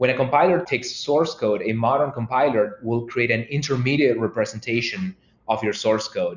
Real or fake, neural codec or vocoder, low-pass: real; none; 7.2 kHz